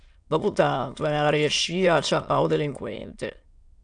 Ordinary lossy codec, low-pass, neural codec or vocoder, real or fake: AAC, 64 kbps; 9.9 kHz; autoencoder, 22.05 kHz, a latent of 192 numbers a frame, VITS, trained on many speakers; fake